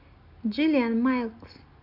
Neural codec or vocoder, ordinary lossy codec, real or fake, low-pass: none; none; real; 5.4 kHz